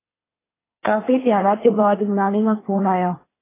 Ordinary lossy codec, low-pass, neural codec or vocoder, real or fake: AAC, 16 kbps; 3.6 kHz; codec, 24 kHz, 1 kbps, SNAC; fake